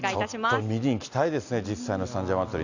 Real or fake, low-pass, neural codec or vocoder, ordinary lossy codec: real; 7.2 kHz; none; none